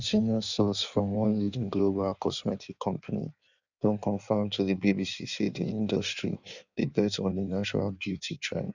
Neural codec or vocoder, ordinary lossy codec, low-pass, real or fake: codec, 16 kHz in and 24 kHz out, 1.1 kbps, FireRedTTS-2 codec; none; 7.2 kHz; fake